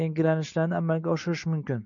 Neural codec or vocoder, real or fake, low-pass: none; real; 7.2 kHz